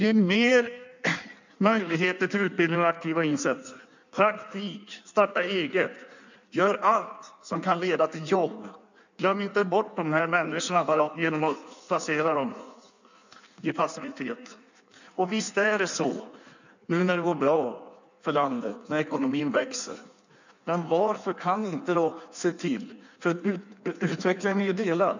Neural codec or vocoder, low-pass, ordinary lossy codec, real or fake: codec, 16 kHz in and 24 kHz out, 1.1 kbps, FireRedTTS-2 codec; 7.2 kHz; none; fake